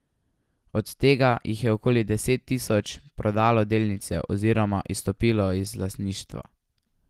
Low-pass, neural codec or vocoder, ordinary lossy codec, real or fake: 14.4 kHz; none; Opus, 24 kbps; real